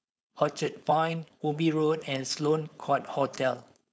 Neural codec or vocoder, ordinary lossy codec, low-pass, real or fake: codec, 16 kHz, 4.8 kbps, FACodec; none; none; fake